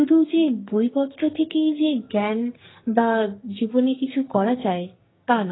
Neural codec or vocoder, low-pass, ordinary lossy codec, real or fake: codec, 44.1 kHz, 2.6 kbps, SNAC; 7.2 kHz; AAC, 16 kbps; fake